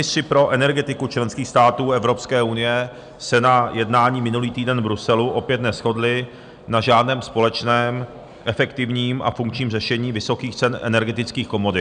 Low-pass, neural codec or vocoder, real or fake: 9.9 kHz; none; real